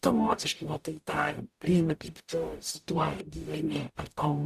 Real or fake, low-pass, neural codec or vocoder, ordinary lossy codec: fake; 14.4 kHz; codec, 44.1 kHz, 0.9 kbps, DAC; Opus, 64 kbps